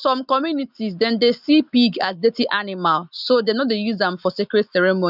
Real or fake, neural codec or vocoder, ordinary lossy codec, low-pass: real; none; none; 5.4 kHz